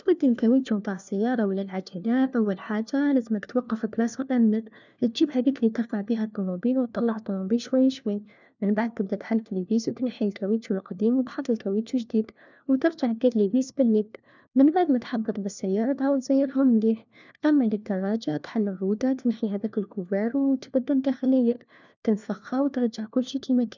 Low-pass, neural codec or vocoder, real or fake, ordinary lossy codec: 7.2 kHz; codec, 16 kHz, 1 kbps, FunCodec, trained on LibriTTS, 50 frames a second; fake; none